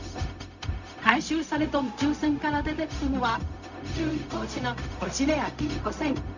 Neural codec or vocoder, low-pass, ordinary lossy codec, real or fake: codec, 16 kHz, 0.4 kbps, LongCat-Audio-Codec; 7.2 kHz; none; fake